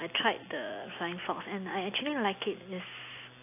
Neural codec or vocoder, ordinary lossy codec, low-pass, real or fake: none; AAC, 24 kbps; 3.6 kHz; real